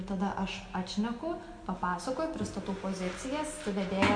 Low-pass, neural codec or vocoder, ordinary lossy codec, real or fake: 9.9 kHz; none; MP3, 64 kbps; real